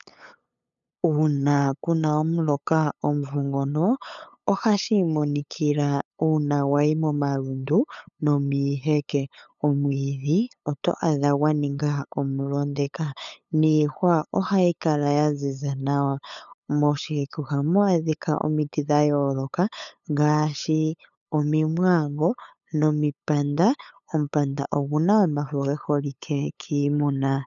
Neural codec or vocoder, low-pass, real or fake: codec, 16 kHz, 8 kbps, FunCodec, trained on LibriTTS, 25 frames a second; 7.2 kHz; fake